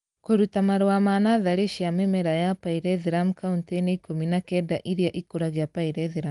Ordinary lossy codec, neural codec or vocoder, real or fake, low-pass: Opus, 32 kbps; none; real; 10.8 kHz